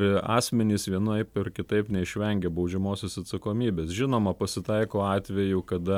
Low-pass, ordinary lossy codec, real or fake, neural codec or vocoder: 19.8 kHz; MP3, 96 kbps; real; none